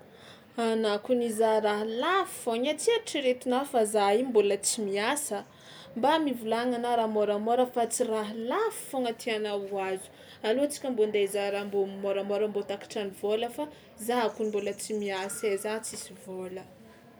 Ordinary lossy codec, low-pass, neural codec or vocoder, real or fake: none; none; none; real